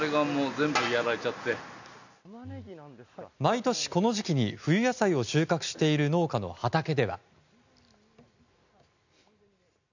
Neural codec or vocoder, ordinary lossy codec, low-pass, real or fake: none; none; 7.2 kHz; real